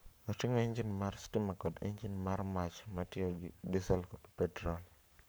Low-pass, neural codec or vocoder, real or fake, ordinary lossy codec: none; codec, 44.1 kHz, 7.8 kbps, Pupu-Codec; fake; none